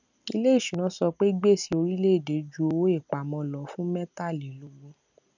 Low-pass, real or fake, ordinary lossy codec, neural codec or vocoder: 7.2 kHz; real; none; none